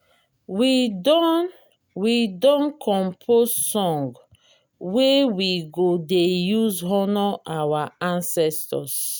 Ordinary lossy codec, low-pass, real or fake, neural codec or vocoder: none; none; real; none